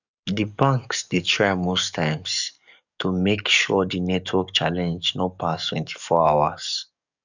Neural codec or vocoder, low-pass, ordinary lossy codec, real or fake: codec, 44.1 kHz, 7.8 kbps, DAC; 7.2 kHz; none; fake